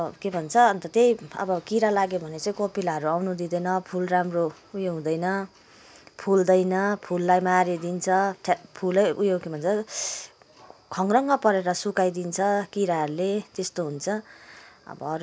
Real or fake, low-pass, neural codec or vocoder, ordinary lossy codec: real; none; none; none